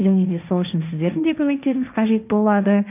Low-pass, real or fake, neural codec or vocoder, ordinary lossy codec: 3.6 kHz; fake; codec, 16 kHz, 1.1 kbps, Voila-Tokenizer; none